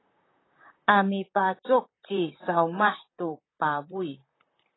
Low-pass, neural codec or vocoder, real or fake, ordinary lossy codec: 7.2 kHz; vocoder, 44.1 kHz, 128 mel bands, Pupu-Vocoder; fake; AAC, 16 kbps